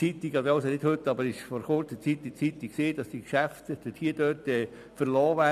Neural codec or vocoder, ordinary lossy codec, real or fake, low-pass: vocoder, 44.1 kHz, 128 mel bands every 256 samples, BigVGAN v2; none; fake; 14.4 kHz